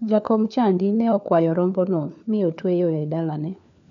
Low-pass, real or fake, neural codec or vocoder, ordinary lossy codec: 7.2 kHz; fake; codec, 16 kHz, 4 kbps, FunCodec, trained on LibriTTS, 50 frames a second; MP3, 96 kbps